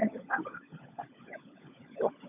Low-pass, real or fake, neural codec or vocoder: 3.6 kHz; fake; codec, 16 kHz, 16 kbps, FunCodec, trained on LibriTTS, 50 frames a second